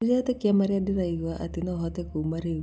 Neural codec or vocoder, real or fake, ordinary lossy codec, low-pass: none; real; none; none